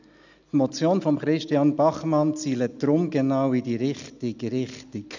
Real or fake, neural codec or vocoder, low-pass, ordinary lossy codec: real; none; 7.2 kHz; none